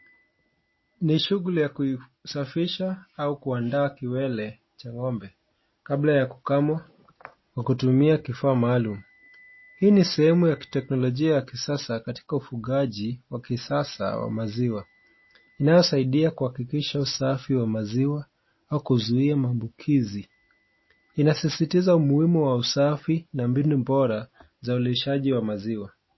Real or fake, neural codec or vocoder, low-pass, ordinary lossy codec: real; none; 7.2 kHz; MP3, 24 kbps